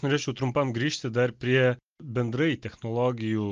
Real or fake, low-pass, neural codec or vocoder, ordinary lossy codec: real; 7.2 kHz; none; Opus, 32 kbps